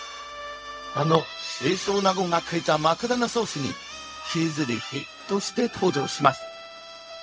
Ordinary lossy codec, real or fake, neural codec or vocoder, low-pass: none; fake; codec, 16 kHz, 0.4 kbps, LongCat-Audio-Codec; none